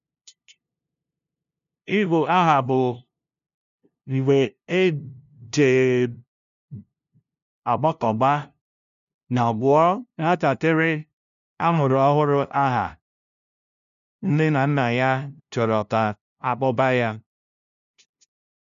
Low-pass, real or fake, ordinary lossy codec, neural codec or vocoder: 7.2 kHz; fake; none; codec, 16 kHz, 0.5 kbps, FunCodec, trained on LibriTTS, 25 frames a second